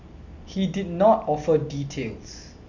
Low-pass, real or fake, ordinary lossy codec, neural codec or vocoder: 7.2 kHz; real; none; none